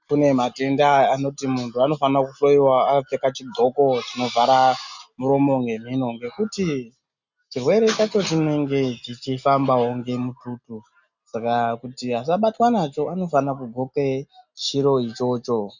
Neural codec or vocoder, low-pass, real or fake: none; 7.2 kHz; real